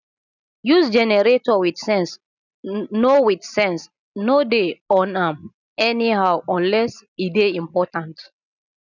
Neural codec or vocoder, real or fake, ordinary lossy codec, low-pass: none; real; none; 7.2 kHz